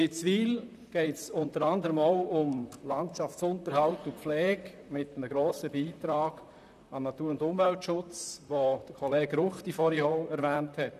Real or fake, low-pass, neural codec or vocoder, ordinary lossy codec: fake; 14.4 kHz; vocoder, 44.1 kHz, 128 mel bands, Pupu-Vocoder; none